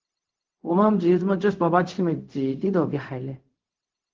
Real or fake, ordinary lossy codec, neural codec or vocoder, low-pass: fake; Opus, 16 kbps; codec, 16 kHz, 0.4 kbps, LongCat-Audio-Codec; 7.2 kHz